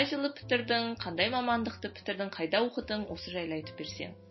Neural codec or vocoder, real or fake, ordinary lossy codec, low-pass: none; real; MP3, 24 kbps; 7.2 kHz